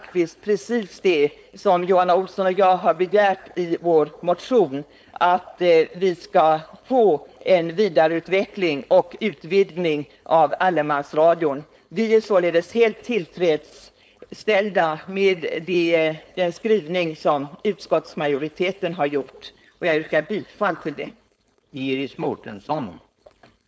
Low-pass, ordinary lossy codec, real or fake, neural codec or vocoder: none; none; fake; codec, 16 kHz, 4.8 kbps, FACodec